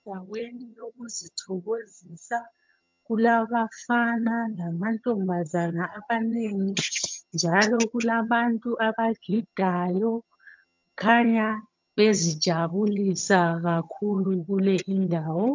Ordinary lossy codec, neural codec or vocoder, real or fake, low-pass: MP3, 64 kbps; vocoder, 22.05 kHz, 80 mel bands, HiFi-GAN; fake; 7.2 kHz